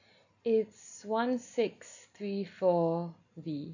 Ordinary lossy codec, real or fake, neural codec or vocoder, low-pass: none; real; none; 7.2 kHz